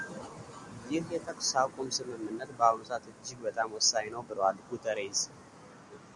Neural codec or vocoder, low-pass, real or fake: none; 10.8 kHz; real